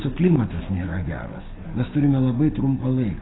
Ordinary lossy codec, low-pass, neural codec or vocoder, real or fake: AAC, 16 kbps; 7.2 kHz; codec, 44.1 kHz, 7.8 kbps, DAC; fake